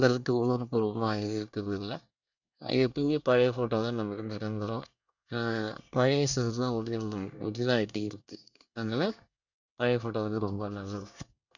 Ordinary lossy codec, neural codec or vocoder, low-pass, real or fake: none; codec, 24 kHz, 1 kbps, SNAC; 7.2 kHz; fake